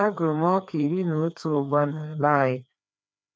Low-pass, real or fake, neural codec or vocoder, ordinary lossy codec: none; fake; codec, 16 kHz, 2 kbps, FreqCodec, larger model; none